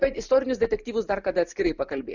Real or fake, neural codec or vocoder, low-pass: real; none; 7.2 kHz